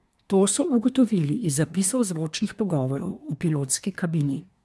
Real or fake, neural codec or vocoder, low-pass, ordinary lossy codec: fake; codec, 24 kHz, 1 kbps, SNAC; none; none